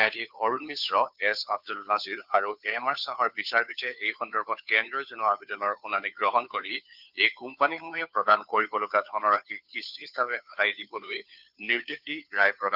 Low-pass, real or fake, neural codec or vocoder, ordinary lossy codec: 5.4 kHz; fake; codec, 16 kHz, 2 kbps, FunCodec, trained on Chinese and English, 25 frames a second; none